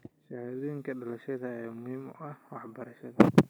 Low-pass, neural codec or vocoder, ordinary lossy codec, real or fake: none; none; none; real